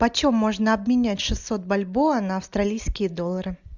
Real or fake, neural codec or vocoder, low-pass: real; none; 7.2 kHz